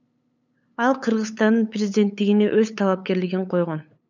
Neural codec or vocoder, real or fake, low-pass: codec, 16 kHz, 8 kbps, FunCodec, trained on LibriTTS, 25 frames a second; fake; 7.2 kHz